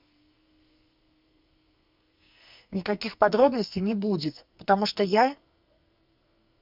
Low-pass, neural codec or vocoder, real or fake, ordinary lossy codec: 5.4 kHz; codec, 44.1 kHz, 2.6 kbps, SNAC; fake; Opus, 64 kbps